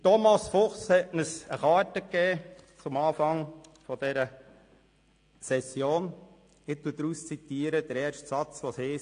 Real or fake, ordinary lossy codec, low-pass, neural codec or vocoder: real; AAC, 48 kbps; 9.9 kHz; none